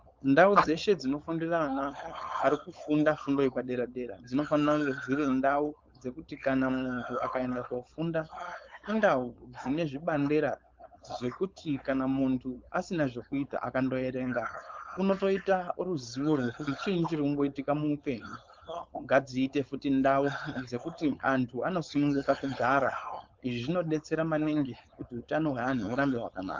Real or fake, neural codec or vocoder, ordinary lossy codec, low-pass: fake; codec, 16 kHz, 4.8 kbps, FACodec; Opus, 24 kbps; 7.2 kHz